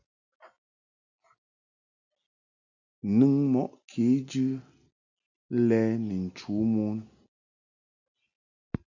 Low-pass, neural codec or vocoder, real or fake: 7.2 kHz; none; real